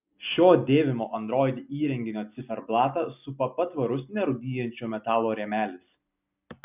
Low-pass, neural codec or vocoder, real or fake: 3.6 kHz; none; real